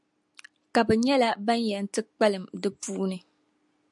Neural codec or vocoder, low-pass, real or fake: none; 10.8 kHz; real